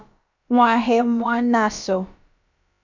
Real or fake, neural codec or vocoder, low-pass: fake; codec, 16 kHz, about 1 kbps, DyCAST, with the encoder's durations; 7.2 kHz